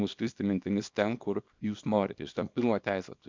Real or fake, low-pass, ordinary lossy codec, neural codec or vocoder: fake; 7.2 kHz; MP3, 64 kbps; codec, 24 kHz, 0.9 kbps, WavTokenizer, small release